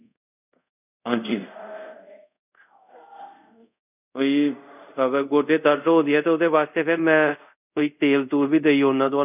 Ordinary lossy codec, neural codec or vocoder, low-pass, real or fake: none; codec, 24 kHz, 0.5 kbps, DualCodec; 3.6 kHz; fake